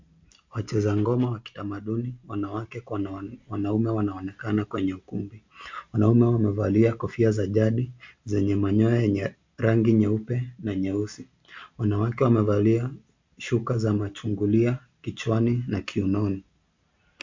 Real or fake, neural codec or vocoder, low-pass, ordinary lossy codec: real; none; 7.2 kHz; MP3, 64 kbps